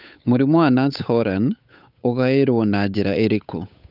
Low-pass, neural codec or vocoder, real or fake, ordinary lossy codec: 5.4 kHz; codec, 16 kHz, 8 kbps, FunCodec, trained on Chinese and English, 25 frames a second; fake; none